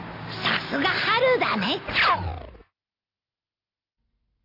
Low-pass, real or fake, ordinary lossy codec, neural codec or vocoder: 5.4 kHz; real; none; none